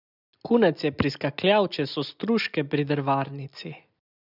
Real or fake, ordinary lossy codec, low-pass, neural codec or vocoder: real; none; 5.4 kHz; none